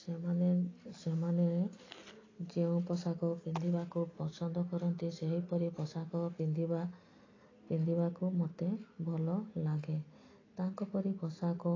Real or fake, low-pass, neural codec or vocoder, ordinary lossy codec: real; 7.2 kHz; none; AAC, 32 kbps